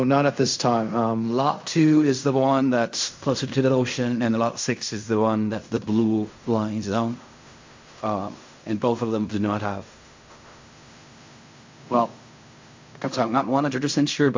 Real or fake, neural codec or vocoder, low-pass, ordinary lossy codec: fake; codec, 16 kHz in and 24 kHz out, 0.4 kbps, LongCat-Audio-Codec, fine tuned four codebook decoder; 7.2 kHz; MP3, 48 kbps